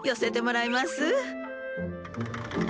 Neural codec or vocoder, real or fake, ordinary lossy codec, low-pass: none; real; none; none